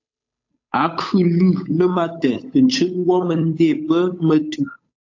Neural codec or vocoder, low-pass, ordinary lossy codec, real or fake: codec, 16 kHz, 8 kbps, FunCodec, trained on Chinese and English, 25 frames a second; 7.2 kHz; AAC, 48 kbps; fake